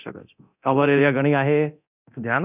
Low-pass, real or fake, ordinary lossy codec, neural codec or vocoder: 3.6 kHz; fake; none; codec, 24 kHz, 0.9 kbps, DualCodec